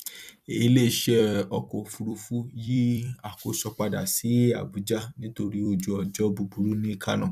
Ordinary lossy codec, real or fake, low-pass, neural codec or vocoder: none; fake; 14.4 kHz; vocoder, 44.1 kHz, 128 mel bands every 256 samples, BigVGAN v2